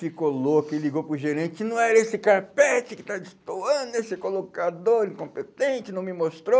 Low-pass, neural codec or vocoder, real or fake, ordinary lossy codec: none; none; real; none